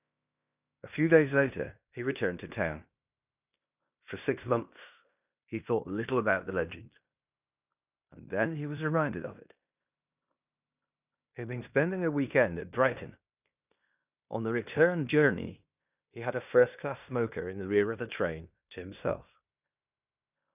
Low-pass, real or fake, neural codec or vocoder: 3.6 kHz; fake; codec, 16 kHz in and 24 kHz out, 0.9 kbps, LongCat-Audio-Codec, fine tuned four codebook decoder